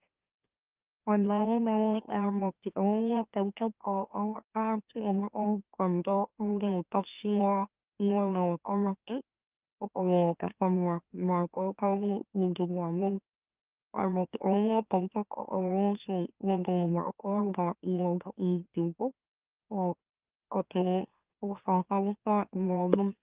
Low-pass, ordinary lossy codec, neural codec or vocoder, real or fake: 3.6 kHz; Opus, 24 kbps; autoencoder, 44.1 kHz, a latent of 192 numbers a frame, MeloTTS; fake